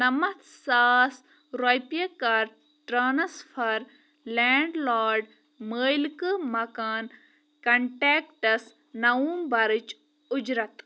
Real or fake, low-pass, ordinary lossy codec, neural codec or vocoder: real; none; none; none